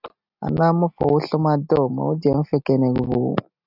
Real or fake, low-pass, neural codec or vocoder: real; 5.4 kHz; none